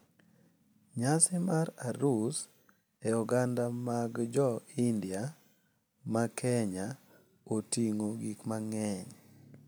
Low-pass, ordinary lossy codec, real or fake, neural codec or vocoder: none; none; real; none